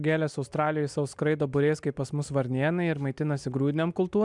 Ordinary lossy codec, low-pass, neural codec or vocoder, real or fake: MP3, 96 kbps; 10.8 kHz; none; real